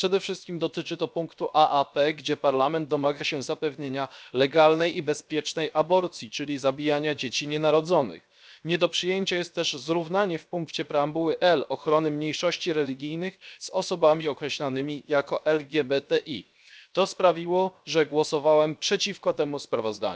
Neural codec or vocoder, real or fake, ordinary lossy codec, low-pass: codec, 16 kHz, 0.7 kbps, FocalCodec; fake; none; none